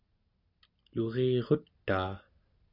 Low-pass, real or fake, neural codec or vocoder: 5.4 kHz; real; none